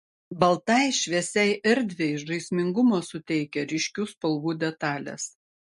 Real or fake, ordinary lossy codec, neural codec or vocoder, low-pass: real; MP3, 48 kbps; none; 14.4 kHz